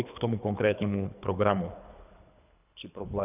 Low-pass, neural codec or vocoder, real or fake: 3.6 kHz; codec, 24 kHz, 3 kbps, HILCodec; fake